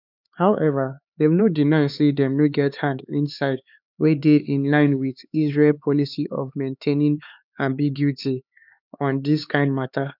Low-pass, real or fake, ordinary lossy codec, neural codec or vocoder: 5.4 kHz; fake; none; codec, 16 kHz, 4 kbps, X-Codec, HuBERT features, trained on LibriSpeech